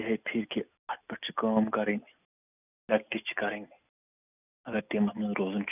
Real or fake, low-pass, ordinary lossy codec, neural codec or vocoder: real; 3.6 kHz; none; none